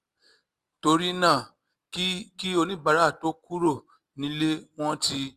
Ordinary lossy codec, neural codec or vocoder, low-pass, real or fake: Opus, 32 kbps; none; 14.4 kHz; real